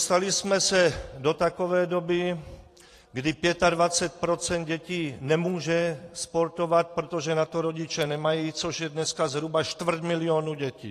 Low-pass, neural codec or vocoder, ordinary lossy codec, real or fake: 14.4 kHz; vocoder, 44.1 kHz, 128 mel bands every 512 samples, BigVGAN v2; AAC, 48 kbps; fake